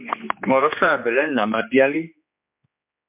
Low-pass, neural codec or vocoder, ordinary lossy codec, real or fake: 3.6 kHz; codec, 16 kHz, 2 kbps, X-Codec, HuBERT features, trained on general audio; MP3, 32 kbps; fake